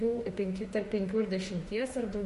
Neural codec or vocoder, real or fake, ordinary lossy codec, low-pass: autoencoder, 48 kHz, 32 numbers a frame, DAC-VAE, trained on Japanese speech; fake; MP3, 48 kbps; 14.4 kHz